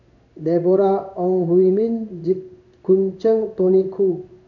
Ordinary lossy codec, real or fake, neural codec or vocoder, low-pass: none; fake; codec, 16 kHz in and 24 kHz out, 1 kbps, XY-Tokenizer; 7.2 kHz